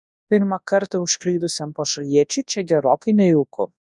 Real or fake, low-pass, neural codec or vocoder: fake; 10.8 kHz; codec, 24 kHz, 0.9 kbps, WavTokenizer, large speech release